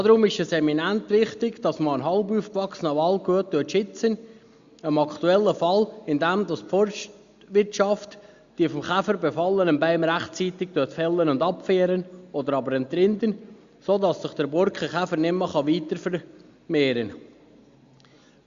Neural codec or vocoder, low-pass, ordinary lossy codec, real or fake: none; 7.2 kHz; Opus, 64 kbps; real